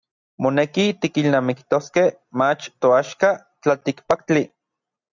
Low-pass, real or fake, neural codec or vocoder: 7.2 kHz; real; none